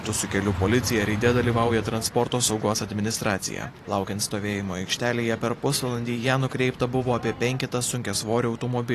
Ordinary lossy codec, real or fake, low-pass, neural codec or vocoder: AAC, 48 kbps; fake; 14.4 kHz; vocoder, 44.1 kHz, 128 mel bands every 512 samples, BigVGAN v2